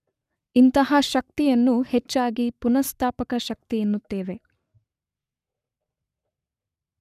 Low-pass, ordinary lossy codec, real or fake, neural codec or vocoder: 14.4 kHz; none; fake; codec, 44.1 kHz, 7.8 kbps, Pupu-Codec